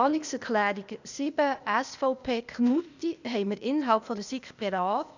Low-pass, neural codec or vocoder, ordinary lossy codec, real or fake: 7.2 kHz; codec, 16 kHz, 0.8 kbps, ZipCodec; none; fake